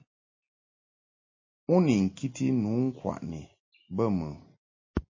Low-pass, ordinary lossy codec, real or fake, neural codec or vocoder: 7.2 kHz; MP3, 32 kbps; real; none